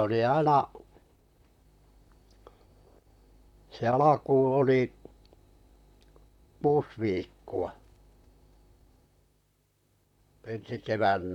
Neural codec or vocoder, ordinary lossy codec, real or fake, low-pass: vocoder, 44.1 kHz, 128 mel bands, Pupu-Vocoder; none; fake; 19.8 kHz